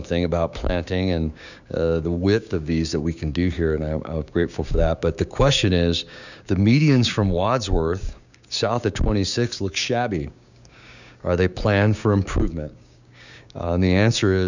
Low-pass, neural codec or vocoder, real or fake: 7.2 kHz; codec, 16 kHz, 6 kbps, DAC; fake